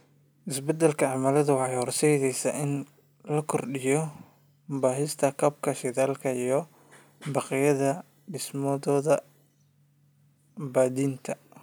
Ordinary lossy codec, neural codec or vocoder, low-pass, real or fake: none; none; none; real